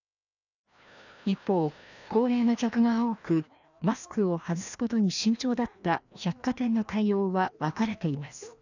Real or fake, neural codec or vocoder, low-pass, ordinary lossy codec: fake; codec, 16 kHz, 1 kbps, FreqCodec, larger model; 7.2 kHz; none